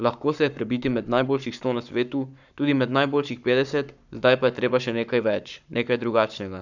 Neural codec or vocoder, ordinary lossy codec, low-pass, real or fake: codec, 44.1 kHz, 7.8 kbps, Pupu-Codec; none; 7.2 kHz; fake